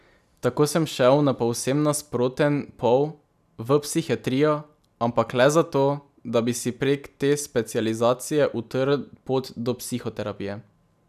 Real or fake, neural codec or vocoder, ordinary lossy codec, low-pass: real; none; none; 14.4 kHz